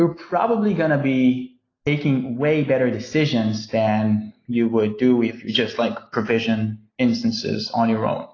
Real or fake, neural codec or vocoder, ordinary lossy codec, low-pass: real; none; AAC, 32 kbps; 7.2 kHz